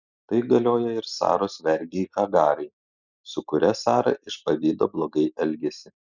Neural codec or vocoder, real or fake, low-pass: none; real; 7.2 kHz